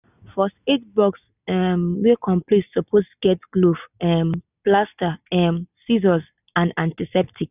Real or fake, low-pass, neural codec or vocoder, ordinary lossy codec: real; 3.6 kHz; none; none